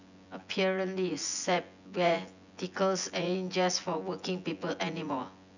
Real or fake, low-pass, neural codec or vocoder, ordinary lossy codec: fake; 7.2 kHz; vocoder, 24 kHz, 100 mel bands, Vocos; none